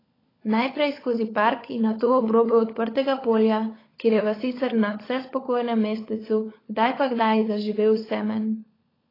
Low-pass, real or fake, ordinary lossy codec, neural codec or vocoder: 5.4 kHz; fake; AAC, 24 kbps; codec, 16 kHz, 16 kbps, FunCodec, trained on LibriTTS, 50 frames a second